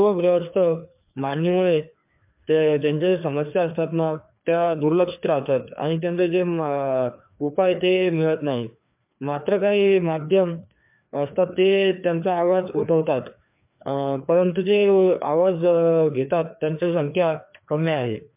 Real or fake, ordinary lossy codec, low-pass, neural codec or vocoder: fake; MP3, 32 kbps; 3.6 kHz; codec, 16 kHz, 2 kbps, FreqCodec, larger model